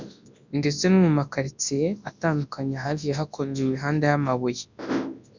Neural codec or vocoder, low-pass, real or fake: codec, 24 kHz, 0.9 kbps, WavTokenizer, large speech release; 7.2 kHz; fake